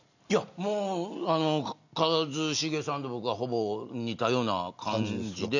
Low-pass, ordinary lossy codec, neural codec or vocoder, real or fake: 7.2 kHz; none; none; real